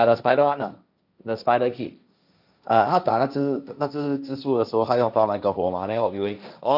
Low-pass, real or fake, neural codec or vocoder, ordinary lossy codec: 5.4 kHz; fake; codec, 16 kHz, 1.1 kbps, Voila-Tokenizer; none